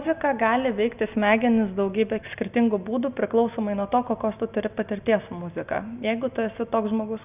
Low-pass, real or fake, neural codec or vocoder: 3.6 kHz; real; none